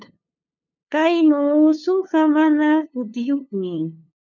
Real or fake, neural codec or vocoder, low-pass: fake; codec, 16 kHz, 2 kbps, FunCodec, trained on LibriTTS, 25 frames a second; 7.2 kHz